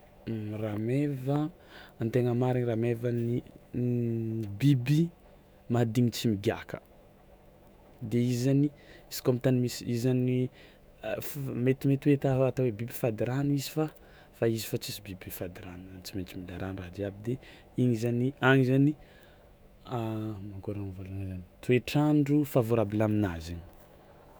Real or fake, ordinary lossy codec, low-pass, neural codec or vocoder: fake; none; none; autoencoder, 48 kHz, 128 numbers a frame, DAC-VAE, trained on Japanese speech